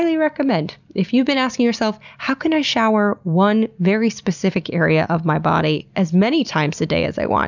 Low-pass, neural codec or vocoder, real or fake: 7.2 kHz; none; real